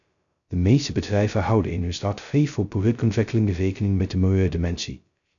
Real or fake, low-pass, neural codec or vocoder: fake; 7.2 kHz; codec, 16 kHz, 0.2 kbps, FocalCodec